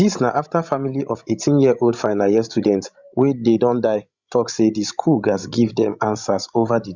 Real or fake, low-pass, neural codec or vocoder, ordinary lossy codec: fake; 7.2 kHz; codec, 16 kHz, 16 kbps, FreqCodec, larger model; Opus, 64 kbps